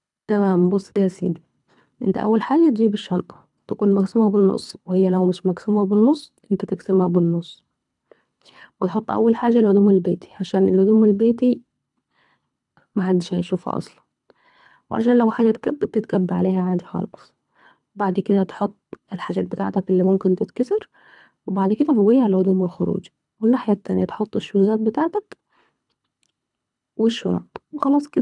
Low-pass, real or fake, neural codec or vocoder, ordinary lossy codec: 10.8 kHz; fake; codec, 24 kHz, 3 kbps, HILCodec; none